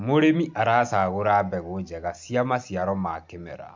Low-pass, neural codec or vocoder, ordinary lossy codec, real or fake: 7.2 kHz; none; MP3, 64 kbps; real